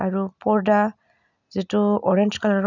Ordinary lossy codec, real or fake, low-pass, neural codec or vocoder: none; real; 7.2 kHz; none